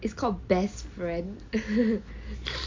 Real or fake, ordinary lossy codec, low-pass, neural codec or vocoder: real; MP3, 48 kbps; 7.2 kHz; none